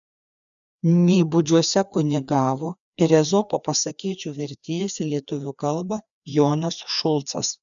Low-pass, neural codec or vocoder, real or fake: 7.2 kHz; codec, 16 kHz, 2 kbps, FreqCodec, larger model; fake